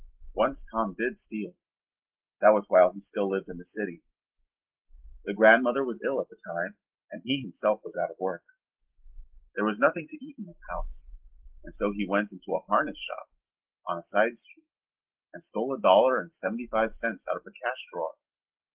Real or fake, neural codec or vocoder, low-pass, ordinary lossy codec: real; none; 3.6 kHz; Opus, 16 kbps